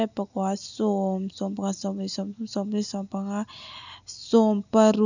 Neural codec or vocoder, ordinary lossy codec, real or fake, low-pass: none; none; real; 7.2 kHz